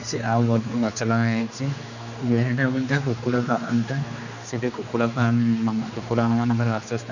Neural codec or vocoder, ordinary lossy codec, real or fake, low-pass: codec, 16 kHz, 2 kbps, X-Codec, HuBERT features, trained on general audio; none; fake; 7.2 kHz